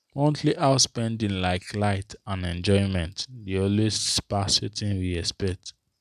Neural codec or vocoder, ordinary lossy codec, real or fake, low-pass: none; none; real; 14.4 kHz